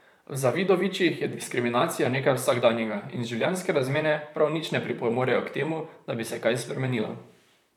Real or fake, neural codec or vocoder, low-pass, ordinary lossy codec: fake; vocoder, 44.1 kHz, 128 mel bands, Pupu-Vocoder; 19.8 kHz; none